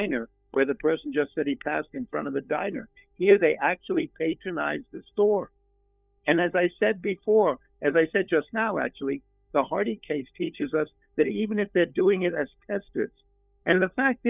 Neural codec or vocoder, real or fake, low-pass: codec, 16 kHz, 4 kbps, FunCodec, trained on LibriTTS, 50 frames a second; fake; 3.6 kHz